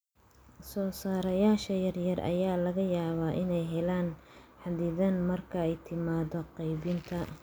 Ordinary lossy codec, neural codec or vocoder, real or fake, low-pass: none; none; real; none